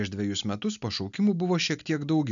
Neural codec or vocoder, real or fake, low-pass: none; real; 7.2 kHz